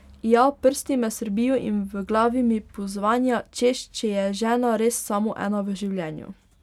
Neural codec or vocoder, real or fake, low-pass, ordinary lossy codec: none; real; 19.8 kHz; none